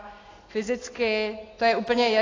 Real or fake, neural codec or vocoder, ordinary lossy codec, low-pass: real; none; AAC, 32 kbps; 7.2 kHz